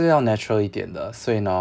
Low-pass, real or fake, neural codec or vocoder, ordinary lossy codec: none; real; none; none